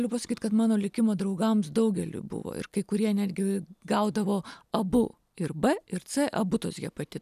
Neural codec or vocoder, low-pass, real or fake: none; 14.4 kHz; real